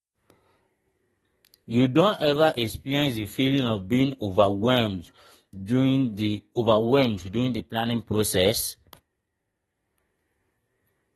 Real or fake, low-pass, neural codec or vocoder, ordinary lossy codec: fake; 14.4 kHz; codec, 32 kHz, 1.9 kbps, SNAC; AAC, 32 kbps